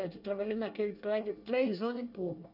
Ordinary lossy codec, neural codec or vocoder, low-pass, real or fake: none; codec, 24 kHz, 1 kbps, SNAC; 5.4 kHz; fake